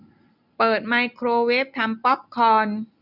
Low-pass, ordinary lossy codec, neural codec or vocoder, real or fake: 5.4 kHz; none; none; real